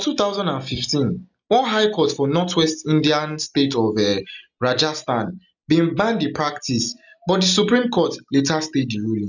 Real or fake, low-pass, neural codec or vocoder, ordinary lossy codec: real; 7.2 kHz; none; none